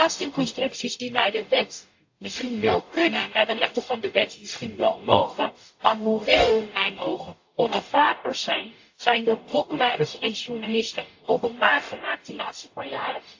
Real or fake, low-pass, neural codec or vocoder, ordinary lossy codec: fake; 7.2 kHz; codec, 44.1 kHz, 0.9 kbps, DAC; AAC, 48 kbps